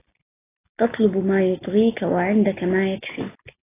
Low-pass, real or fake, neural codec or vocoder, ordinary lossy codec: 3.6 kHz; real; none; AAC, 16 kbps